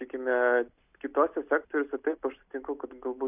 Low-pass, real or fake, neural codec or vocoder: 3.6 kHz; real; none